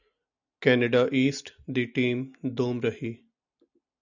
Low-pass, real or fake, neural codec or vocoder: 7.2 kHz; real; none